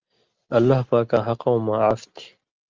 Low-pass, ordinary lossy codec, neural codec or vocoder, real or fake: 7.2 kHz; Opus, 24 kbps; none; real